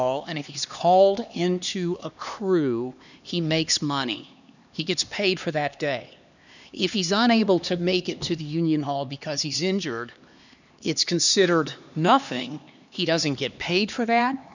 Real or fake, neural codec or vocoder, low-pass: fake; codec, 16 kHz, 2 kbps, X-Codec, HuBERT features, trained on LibriSpeech; 7.2 kHz